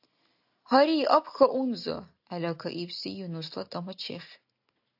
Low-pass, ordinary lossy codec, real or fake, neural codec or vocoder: 5.4 kHz; AAC, 48 kbps; real; none